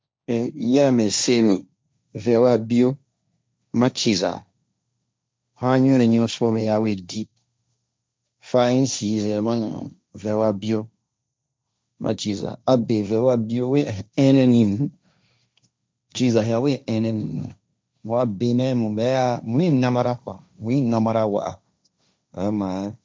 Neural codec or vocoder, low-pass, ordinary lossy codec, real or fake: codec, 16 kHz, 1.1 kbps, Voila-Tokenizer; none; none; fake